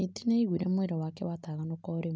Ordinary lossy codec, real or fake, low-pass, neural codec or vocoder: none; real; none; none